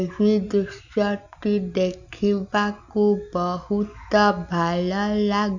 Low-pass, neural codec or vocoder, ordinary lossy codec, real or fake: 7.2 kHz; none; none; real